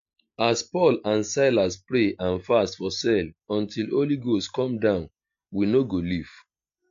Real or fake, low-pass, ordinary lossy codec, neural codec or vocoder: real; 7.2 kHz; AAC, 64 kbps; none